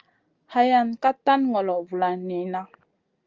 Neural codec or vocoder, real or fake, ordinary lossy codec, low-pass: none; real; Opus, 24 kbps; 7.2 kHz